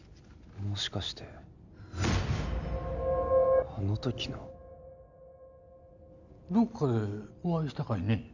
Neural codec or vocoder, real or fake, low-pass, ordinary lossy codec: none; real; 7.2 kHz; none